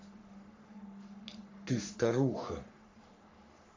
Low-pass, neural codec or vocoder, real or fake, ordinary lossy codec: 7.2 kHz; codec, 44.1 kHz, 3.4 kbps, Pupu-Codec; fake; MP3, 64 kbps